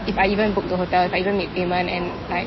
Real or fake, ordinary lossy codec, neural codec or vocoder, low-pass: real; MP3, 24 kbps; none; 7.2 kHz